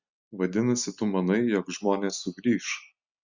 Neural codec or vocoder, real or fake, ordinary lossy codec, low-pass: none; real; Opus, 64 kbps; 7.2 kHz